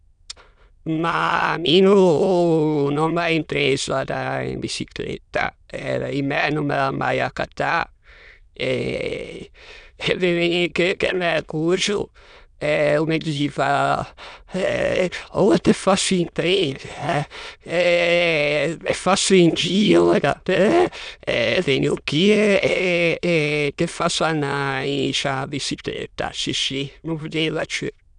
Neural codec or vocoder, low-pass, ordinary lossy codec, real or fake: autoencoder, 22.05 kHz, a latent of 192 numbers a frame, VITS, trained on many speakers; 9.9 kHz; none; fake